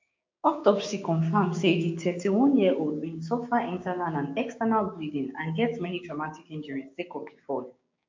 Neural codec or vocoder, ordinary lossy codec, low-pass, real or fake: codec, 16 kHz, 6 kbps, DAC; MP3, 48 kbps; 7.2 kHz; fake